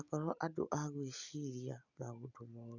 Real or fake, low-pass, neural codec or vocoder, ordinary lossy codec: real; 7.2 kHz; none; none